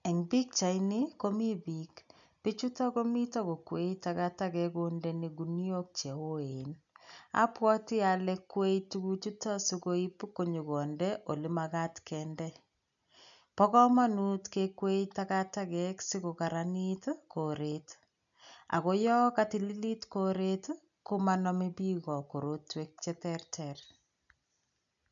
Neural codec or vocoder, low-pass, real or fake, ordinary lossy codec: none; 7.2 kHz; real; none